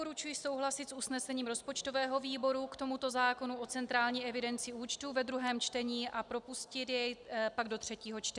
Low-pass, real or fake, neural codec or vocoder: 10.8 kHz; real; none